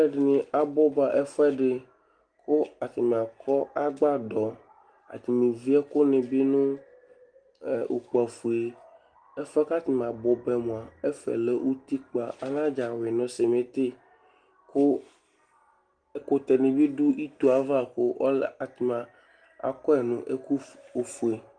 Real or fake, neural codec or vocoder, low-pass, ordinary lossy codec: real; none; 9.9 kHz; Opus, 24 kbps